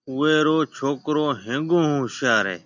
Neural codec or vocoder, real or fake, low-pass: none; real; 7.2 kHz